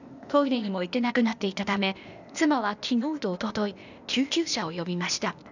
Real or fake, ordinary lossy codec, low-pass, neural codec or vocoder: fake; none; 7.2 kHz; codec, 16 kHz, 0.8 kbps, ZipCodec